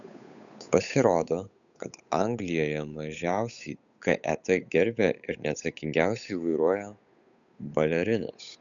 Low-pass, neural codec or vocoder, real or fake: 7.2 kHz; codec, 16 kHz, 8 kbps, FunCodec, trained on Chinese and English, 25 frames a second; fake